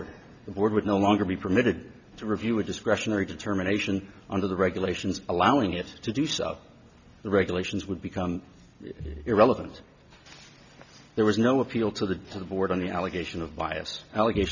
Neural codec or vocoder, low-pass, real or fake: none; 7.2 kHz; real